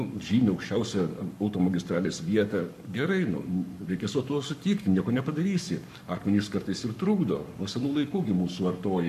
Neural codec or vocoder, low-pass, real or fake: codec, 44.1 kHz, 7.8 kbps, Pupu-Codec; 14.4 kHz; fake